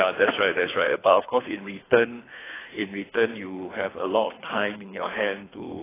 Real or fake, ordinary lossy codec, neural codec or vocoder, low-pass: fake; AAC, 16 kbps; codec, 24 kHz, 3 kbps, HILCodec; 3.6 kHz